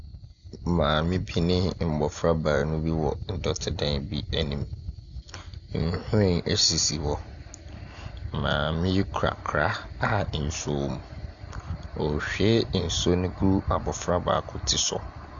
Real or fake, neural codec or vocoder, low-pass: real; none; 7.2 kHz